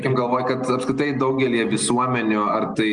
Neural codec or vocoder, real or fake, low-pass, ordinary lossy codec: none; real; 9.9 kHz; Opus, 32 kbps